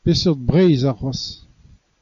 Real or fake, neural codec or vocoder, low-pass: real; none; 7.2 kHz